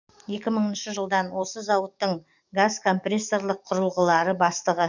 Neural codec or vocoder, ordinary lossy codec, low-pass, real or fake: codec, 44.1 kHz, 7.8 kbps, DAC; none; 7.2 kHz; fake